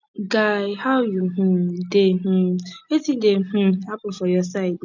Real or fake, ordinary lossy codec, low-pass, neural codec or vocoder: real; none; 7.2 kHz; none